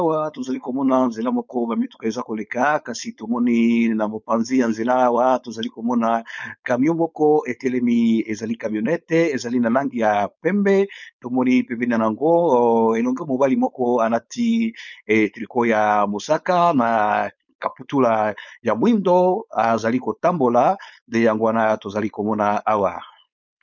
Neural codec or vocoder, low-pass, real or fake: codec, 16 kHz, 4.8 kbps, FACodec; 7.2 kHz; fake